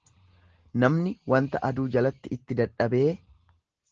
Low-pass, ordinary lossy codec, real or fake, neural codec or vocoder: 7.2 kHz; Opus, 16 kbps; real; none